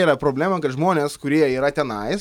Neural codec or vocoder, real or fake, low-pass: none; real; 19.8 kHz